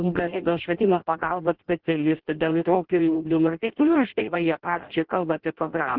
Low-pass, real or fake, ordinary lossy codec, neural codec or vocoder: 5.4 kHz; fake; Opus, 16 kbps; codec, 16 kHz in and 24 kHz out, 0.6 kbps, FireRedTTS-2 codec